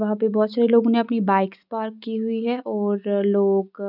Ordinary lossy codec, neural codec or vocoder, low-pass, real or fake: none; none; 5.4 kHz; real